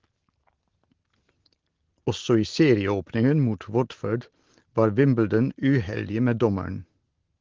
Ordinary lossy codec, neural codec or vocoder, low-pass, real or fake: Opus, 16 kbps; none; 7.2 kHz; real